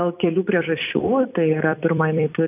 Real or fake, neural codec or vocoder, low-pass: fake; vocoder, 44.1 kHz, 128 mel bands every 256 samples, BigVGAN v2; 3.6 kHz